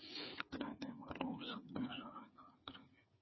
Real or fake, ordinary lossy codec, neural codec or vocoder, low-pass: fake; MP3, 24 kbps; codec, 16 kHz, 8 kbps, FreqCodec, smaller model; 7.2 kHz